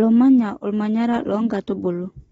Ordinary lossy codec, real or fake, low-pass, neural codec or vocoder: AAC, 24 kbps; real; 19.8 kHz; none